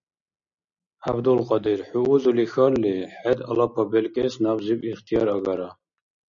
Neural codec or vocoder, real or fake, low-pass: none; real; 7.2 kHz